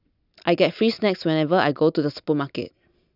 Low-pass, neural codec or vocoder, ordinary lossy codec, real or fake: 5.4 kHz; none; none; real